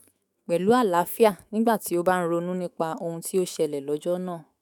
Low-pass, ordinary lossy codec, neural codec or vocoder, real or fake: none; none; autoencoder, 48 kHz, 128 numbers a frame, DAC-VAE, trained on Japanese speech; fake